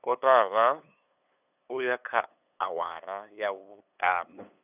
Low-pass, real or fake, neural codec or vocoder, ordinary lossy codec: 3.6 kHz; fake; codec, 16 kHz, 8 kbps, FunCodec, trained on LibriTTS, 25 frames a second; none